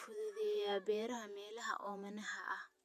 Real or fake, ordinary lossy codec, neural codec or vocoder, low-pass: fake; none; vocoder, 48 kHz, 128 mel bands, Vocos; 14.4 kHz